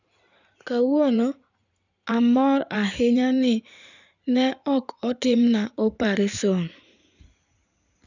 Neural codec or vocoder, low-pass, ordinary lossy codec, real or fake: codec, 16 kHz in and 24 kHz out, 2.2 kbps, FireRedTTS-2 codec; 7.2 kHz; none; fake